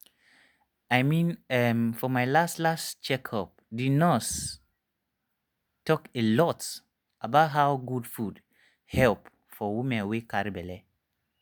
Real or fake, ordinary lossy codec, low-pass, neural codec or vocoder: real; none; none; none